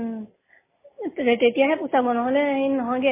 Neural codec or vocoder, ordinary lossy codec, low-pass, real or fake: none; MP3, 16 kbps; 3.6 kHz; real